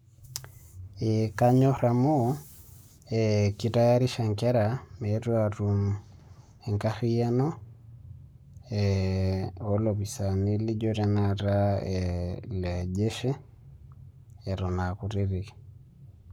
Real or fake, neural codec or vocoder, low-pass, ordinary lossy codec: fake; codec, 44.1 kHz, 7.8 kbps, Pupu-Codec; none; none